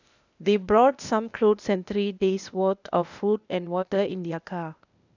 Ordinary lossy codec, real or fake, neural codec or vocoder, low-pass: none; fake; codec, 16 kHz, 0.8 kbps, ZipCodec; 7.2 kHz